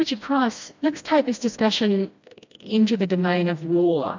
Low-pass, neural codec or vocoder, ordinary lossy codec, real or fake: 7.2 kHz; codec, 16 kHz, 1 kbps, FreqCodec, smaller model; MP3, 64 kbps; fake